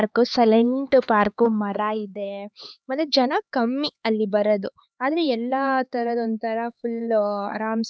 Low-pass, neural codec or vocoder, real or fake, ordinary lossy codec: none; codec, 16 kHz, 4 kbps, X-Codec, HuBERT features, trained on LibriSpeech; fake; none